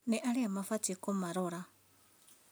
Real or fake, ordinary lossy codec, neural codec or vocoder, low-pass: real; none; none; none